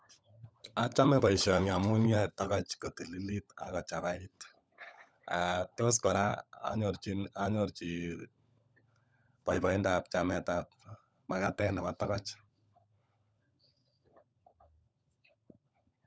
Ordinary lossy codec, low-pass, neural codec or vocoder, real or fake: none; none; codec, 16 kHz, 16 kbps, FunCodec, trained on LibriTTS, 50 frames a second; fake